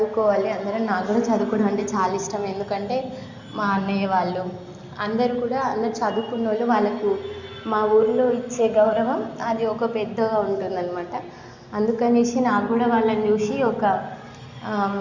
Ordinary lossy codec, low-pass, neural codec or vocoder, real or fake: Opus, 64 kbps; 7.2 kHz; none; real